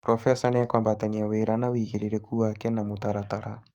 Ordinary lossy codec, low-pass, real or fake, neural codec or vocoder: Opus, 64 kbps; 19.8 kHz; fake; codec, 44.1 kHz, 7.8 kbps, DAC